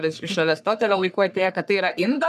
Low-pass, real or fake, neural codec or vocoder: 14.4 kHz; fake; codec, 44.1 kHz, 3.4 kbps, Pupu-Codec